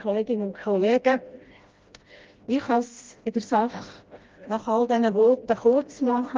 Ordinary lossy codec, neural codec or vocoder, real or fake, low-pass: Opus, 32 kbps; codec, 16 kHz, 1 kbps, FreqCodec, smaller model; fake; 7.2 kHz